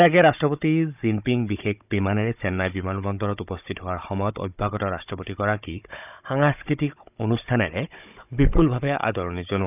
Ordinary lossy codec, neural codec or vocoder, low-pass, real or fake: none; codec, 16 kHz, 16 kbps, FunCodec, trained on Chinese and English, 50 frames a second; 3.6 kHz; fake